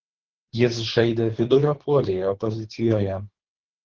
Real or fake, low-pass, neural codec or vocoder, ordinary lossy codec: fake; 7.2 kHz; codec, 24 kHz, 3 kbps, HILCodec; Opus, 16 kbps